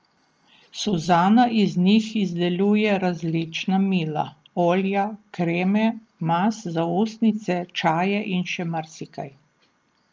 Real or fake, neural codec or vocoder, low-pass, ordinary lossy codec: real; none; 7.2 kHz; Opus, 24 kbps